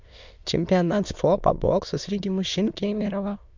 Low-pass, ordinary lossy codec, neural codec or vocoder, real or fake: 7.2 kHz; MP3, 64 kbps; autoencoder, 22.05 kHz, a latent of 192 numbers a frame, VITS, trained on many speakers; fake